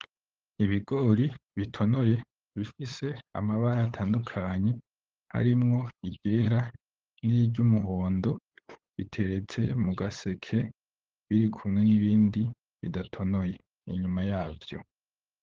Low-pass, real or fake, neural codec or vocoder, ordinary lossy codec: 7.2 kHz; fake; codec, 16 kHz, 8 kbps, FunCodec, trained on LibriTTS, 25 frames a second; Opus, 16 kbps